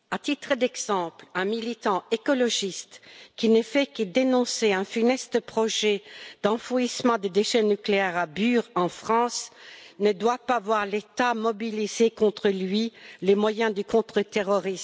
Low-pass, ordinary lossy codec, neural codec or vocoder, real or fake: none; none; none; real